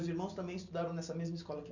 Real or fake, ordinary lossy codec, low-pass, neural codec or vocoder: real; none; 7.2 kHz; none